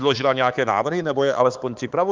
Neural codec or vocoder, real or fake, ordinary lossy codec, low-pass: codec, 16 kHz, 4 kbps, X-Codec, HuBERT features, trained on balanced general audio; fake; Opus, 32 kbps; 7.2 kHz